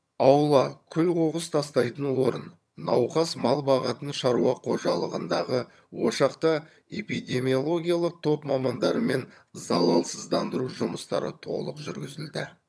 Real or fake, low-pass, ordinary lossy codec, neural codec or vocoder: fake; none; none; vocoder, 22.05 kHz, 80 mel bands, HiFi-GAN